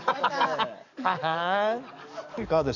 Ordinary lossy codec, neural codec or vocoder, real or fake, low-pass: none; codec, 44.1 kHz, 7.8 kbps, DAC; fake; 7.2 kHz